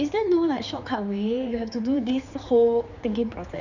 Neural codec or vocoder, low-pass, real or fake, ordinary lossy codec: codec, 16 kHz, 4 kbps, X-Codec, HuBERT features, trained on general audio; 7.2 kHz; fake; none